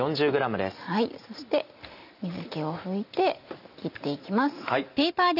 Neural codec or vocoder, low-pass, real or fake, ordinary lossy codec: none; 5.4 kHz; real; none